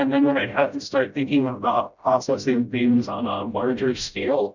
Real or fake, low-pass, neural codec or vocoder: fake; 7.2 kHz; codec, 16 kHz, 0.5 kbps, FreqCodec, smaller model